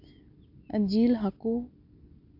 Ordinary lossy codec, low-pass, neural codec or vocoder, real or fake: AAC, 32 kbps; 5.4 kHz; none; real